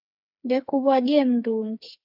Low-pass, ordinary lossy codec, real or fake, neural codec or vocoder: 5.4 kHz; AAC, 48 kbps; fake; codec, 16 kHz, 4 kbps, FreqCodec, smaller model